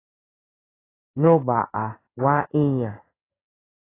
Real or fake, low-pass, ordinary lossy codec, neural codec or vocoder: fake; 3.6 kHz; AAC, 16 kbps; codec, 16 kHz in and 24 kHz out, 1 kbps, XY-Tokenizer